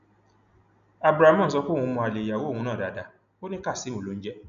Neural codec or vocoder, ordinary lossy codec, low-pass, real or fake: none; none; 7.2 kHz; real